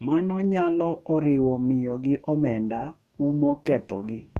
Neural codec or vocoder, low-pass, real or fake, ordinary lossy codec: codec, 44.1 kHz, 2.6 kbps, DAC; 14.4 kHz; fake; Opus, 64 kbps